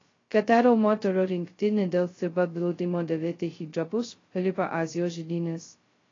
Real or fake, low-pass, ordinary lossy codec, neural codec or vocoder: fake; 7.2 kHz; AAC, 32 kbps; codec, 16 kHz, 0.2 kbps, FocalCodec